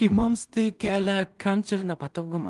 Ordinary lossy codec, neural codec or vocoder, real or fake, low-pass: Opus, 32 kbps; codec, 16 kHz in and 24 kHz out, 0.4 kbps, LongCat-Audio-Codec, two codebook decoder; fake; 10.8 kHz